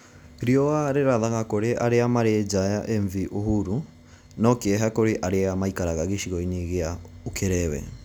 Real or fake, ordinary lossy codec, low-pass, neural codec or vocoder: real; none; none; none